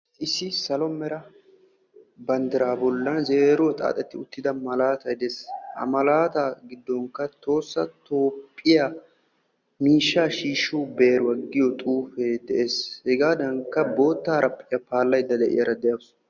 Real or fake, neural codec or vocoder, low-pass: real; none; 7.2 kHz